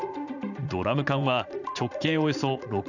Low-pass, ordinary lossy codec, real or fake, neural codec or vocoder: 7.2 kHz; none; fake; vocoder, 44.1 kHz, 80 mel bands, Vocos